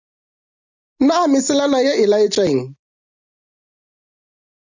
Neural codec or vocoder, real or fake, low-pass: none; real; 7.2 kHz